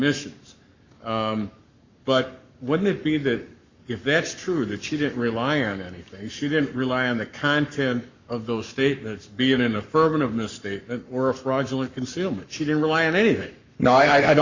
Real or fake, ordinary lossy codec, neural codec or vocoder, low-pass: fake; Opus, 64 kbps; codec, 44.1 kHz, 7.8 kbps, Pupu-Codec; 7.2 kHz